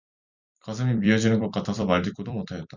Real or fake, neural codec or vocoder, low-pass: fake; vocoder, 44.1 kHz, 128 mel bands every 256 samples, BigVGAN v2; 7.2 kHz